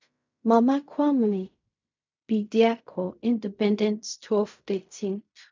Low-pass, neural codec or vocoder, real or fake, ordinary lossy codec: 7.2 kHz; codec, 16 kHz in and 24 kHz out, 0.4 kbps, LongCat-Audio-Codec, fine tuned four codebook decoder; fake; MP3, 64 kbps